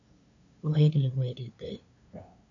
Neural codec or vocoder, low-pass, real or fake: codec, 16 kHz, 2 kbps, FunCodec, trained on LibriTTS, 25 frames a second; 7.2 kHz; fake